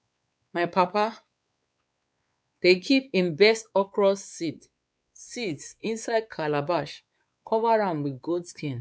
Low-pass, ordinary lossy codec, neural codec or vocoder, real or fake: none; none; codec, 16 kHz, 4 kbps, X-Codec, WavLM features, trained on Multilingual LibriSpeech; fake